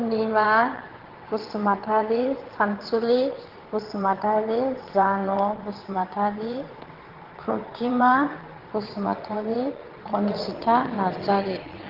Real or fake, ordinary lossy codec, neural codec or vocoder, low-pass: fake; Opus, 16 kbps; vocoder, 22.05 kHz, 80 mel bands, WaveNeXt; 5.4 kHz